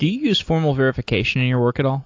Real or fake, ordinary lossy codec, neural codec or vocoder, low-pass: real; AAC, 48 kbps; none; 7.2 kHz